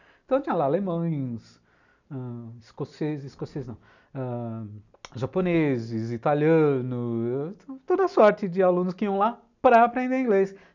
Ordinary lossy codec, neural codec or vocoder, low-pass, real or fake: none; none; 7.2 kHz; real